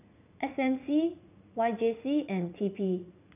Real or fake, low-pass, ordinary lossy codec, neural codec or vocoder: fake; 3.6 kHz; none; vocoder, 44.1 kHz, 80 mel bands, Vocos